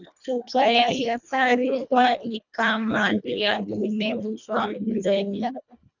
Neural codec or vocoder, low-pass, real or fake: codec, 24 kHz, 1.5 kbps, HILCodec; 7.2 kHz; fake